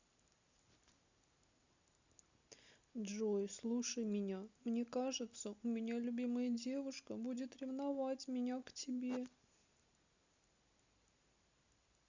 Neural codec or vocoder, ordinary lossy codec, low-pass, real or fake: none; Opus, 64 kbps; 7.2 kHz; real